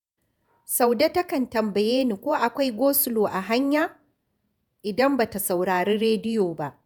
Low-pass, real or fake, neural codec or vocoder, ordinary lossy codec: none; fake; vocoder, 48 kHz, 128 mel bands, Vocos; none